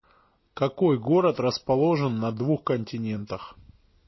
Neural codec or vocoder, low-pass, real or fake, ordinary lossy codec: none; 7.2 kHz; real; MP3, 24 kbps